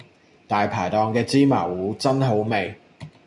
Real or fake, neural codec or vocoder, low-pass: real; none; 10.8 kHz